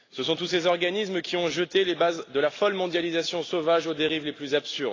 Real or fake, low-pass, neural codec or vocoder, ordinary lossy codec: real; 7.2 kHz; none; AAC, 32 kbps